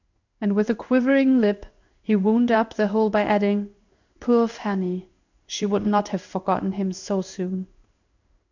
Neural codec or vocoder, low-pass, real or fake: codec, 16 kHz in and 24 kHz out, 1 kbps, XY-Tokenizer; 7.2 kHz; fake